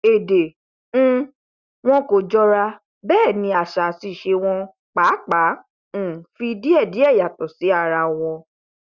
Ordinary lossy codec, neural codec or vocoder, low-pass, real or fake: Opus, 64 kbps; none; 7.2 kHz; real